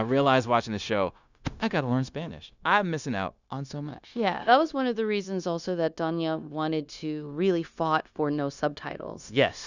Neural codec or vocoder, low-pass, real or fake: codec, 16 kHz, 0.9 kbps, LongCat-Audio-Codec; 7.2 kHz; fake